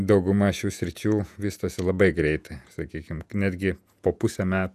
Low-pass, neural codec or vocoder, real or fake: 14.4 kHz; vocoder, 48 kHz, 128 mel bands, Vocos; fake